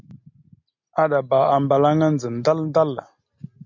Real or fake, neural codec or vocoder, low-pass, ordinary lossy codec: real; none; 7.2 kHz; MP3, 48 kbps